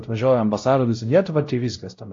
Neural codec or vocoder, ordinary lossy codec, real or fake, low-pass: codec, 16 kHz, 0.5 kbps, X-Codec, WavLM features, trained on Multilingual LibriSpeech; AAC, 48 kbps; fake; 7.2 kHz